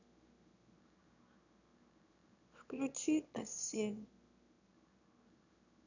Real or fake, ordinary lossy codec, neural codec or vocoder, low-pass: fake; none; autoencoder, 22.05 kHz, a latent of 192 numbers a frame, VITS, trained on one speaker; 7.2 kHz